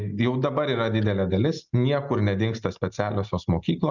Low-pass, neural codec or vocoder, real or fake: 7.2 kHz; none; real